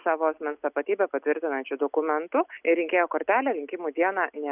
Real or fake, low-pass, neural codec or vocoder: real; 3.6 kHz; none